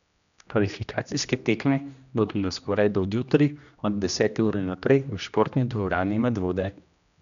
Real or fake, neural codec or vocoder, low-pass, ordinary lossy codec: fake; codec, 16 kHz, 1 kbps, X-Codec, HuBERT features, trained on general audio; 7.2 kHz; none